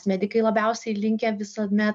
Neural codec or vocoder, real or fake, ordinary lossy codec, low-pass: none; real; MP3, 96 kbps; 9.9 kHz